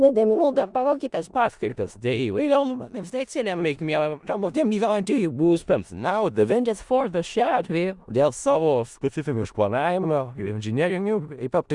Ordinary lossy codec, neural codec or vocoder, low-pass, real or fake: Opus, 64 kbps; codec, 16 kHz in and 24 kHz out, 0.4 kbps, LongCat-Audio-Codec, four codebook decoder; 10.8 kHz; fake